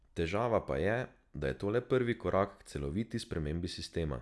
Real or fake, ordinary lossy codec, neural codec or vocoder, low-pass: real; none; none; none